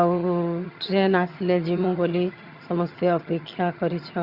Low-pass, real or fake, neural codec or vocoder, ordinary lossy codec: 5.4 kHz; fake; vocoder, 22.05 kHz, 80 mel bands, HiFi-GAN; Opus, 64 kbps